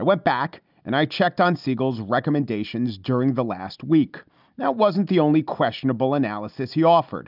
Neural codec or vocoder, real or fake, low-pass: none; real; 5.4 kHz